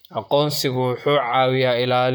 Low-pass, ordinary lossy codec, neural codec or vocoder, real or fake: none; none; vocoder, 44.1 kHz, 128 mel bands, Pupu-Vocoder; fake